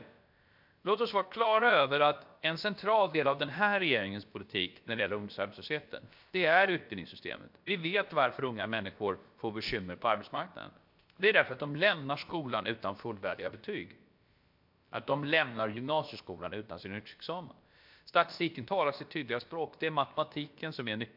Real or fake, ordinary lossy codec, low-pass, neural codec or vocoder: fake; MP3, 48 kbps; 5.4 kHz; codec, 16 kHz, about 1 kbps, DyCAST, with the encoder's durations